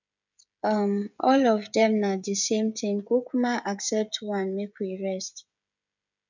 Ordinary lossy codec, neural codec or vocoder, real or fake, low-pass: none; codec, 16 kHz, 16 kbps, FreqCodec, smaller model; fake; 7.2 kHz